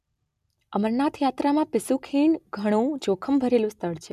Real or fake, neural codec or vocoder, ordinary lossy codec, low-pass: real; none; none; 14.4 kHz